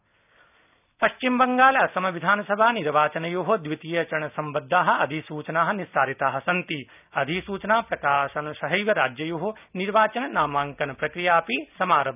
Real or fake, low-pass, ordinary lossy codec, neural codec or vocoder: real; 3.6 kHz; none; none